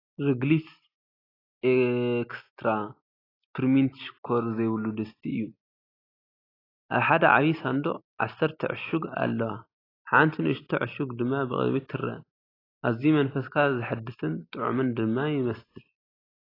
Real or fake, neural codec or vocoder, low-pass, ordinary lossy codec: real; none; 5.4 kHz; AAC, 24 kbps